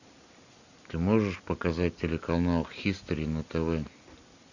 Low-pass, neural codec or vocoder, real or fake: 7.2 kHz; none; real